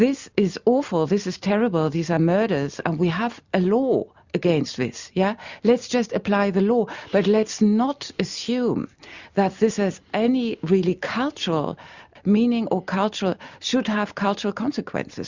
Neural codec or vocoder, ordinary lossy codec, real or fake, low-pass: none; Opus, 64 kbps; real; 7.2 kHz